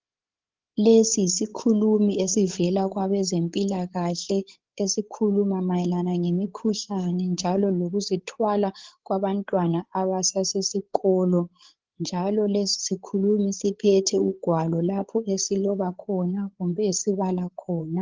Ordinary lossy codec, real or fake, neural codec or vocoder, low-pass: Opus, 16 kbps; fake; codec, 16 kHz, 8 kbps, FreqCodec, larger model; 7.2 kHz